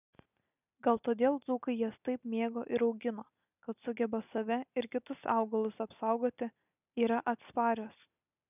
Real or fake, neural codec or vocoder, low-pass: real; none; 3.6 kHz